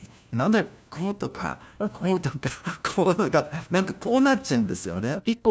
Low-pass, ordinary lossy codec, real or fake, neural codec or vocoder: none; none; fake; codec, 16 kHz, 1 kbps, FunCodec, trained on LibriTTS, 50 frames a second